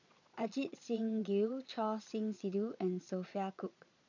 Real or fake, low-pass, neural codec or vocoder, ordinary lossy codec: fake; 7.2 kHz; vocoder, 22.05 kHz, 80 mel bands, Vocos; none